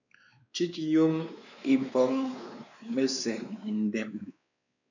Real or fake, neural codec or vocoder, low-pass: fake; codec, 16 kHz, 2 kbps, X-Codec, WavLM features, trained on Multilingual LibriSpeech; 7.2 kHz